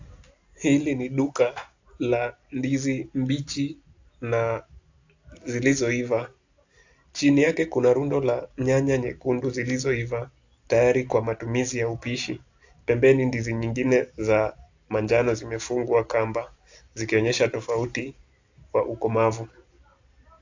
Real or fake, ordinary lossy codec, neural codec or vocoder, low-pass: real; AAC, 48 kbps; none; 7.2 kHz